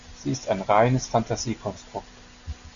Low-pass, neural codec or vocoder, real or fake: 7.2 kHz; none; real